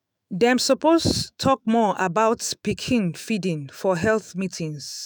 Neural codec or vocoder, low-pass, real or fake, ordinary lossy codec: autoencoder, 48 kHz, 128 numbers a frame, DAC-VAE, trained on Japanese speech; none; fake; none